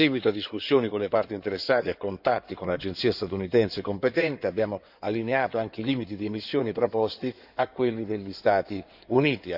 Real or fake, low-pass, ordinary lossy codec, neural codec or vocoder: fake; 5.4 kHz; none; codec, 16 kHz in and 24 kHz out, 2.2 kbps, FireRedTTS-2 codec